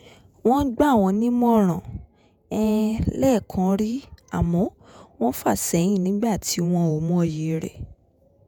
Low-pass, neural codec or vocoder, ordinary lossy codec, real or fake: none; vocoder, 48 kHz, 128 mel bands, Vocos; none; fake